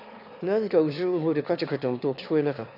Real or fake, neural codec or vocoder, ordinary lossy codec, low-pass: fake; autoencoder, 22.05 kHz, a latent of 192 numbers a frame, VITS, trained on one speaker; none; 5.4 kHz